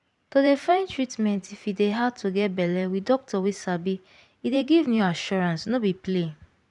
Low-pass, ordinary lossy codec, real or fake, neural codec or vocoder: 10.8 kHz; none; fake; vocoder, 44.1 kHz, 128 mel bands every 512 samples, BigVGAN v2